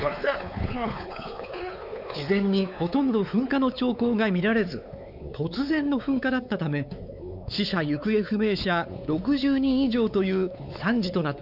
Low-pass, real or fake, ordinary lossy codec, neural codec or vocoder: 5.4 kHz; fake; none; codec, 16 kHz, 4 kbps, X-Codec, WavLM features, trained on Multilingual LibriSpeech